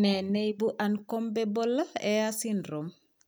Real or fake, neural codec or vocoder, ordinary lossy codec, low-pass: real; none; none; none